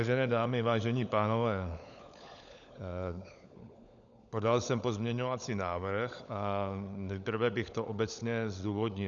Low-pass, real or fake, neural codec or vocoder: 7.2 kHz; fake; codec, 16 kHz, 16 kbps, FunCodec, trained on LibriTTS, 50 frames a second